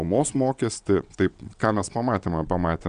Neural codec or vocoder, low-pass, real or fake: vocoder, 22.05 kHz, 80 mel bands, WaveNeXt; 9.9 kHz; fake